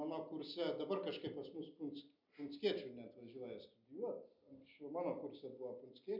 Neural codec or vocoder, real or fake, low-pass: none; real; 5.4 kHz